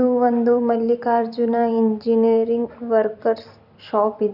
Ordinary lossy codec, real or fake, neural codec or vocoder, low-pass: none; fake; vocoder, 44.1 kHz, 128 mel bands every 256 samples, BigVGAN v2; 5.4 kHz